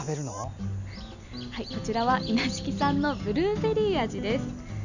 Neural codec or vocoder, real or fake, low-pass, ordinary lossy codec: none; real; 7.2 kHz; none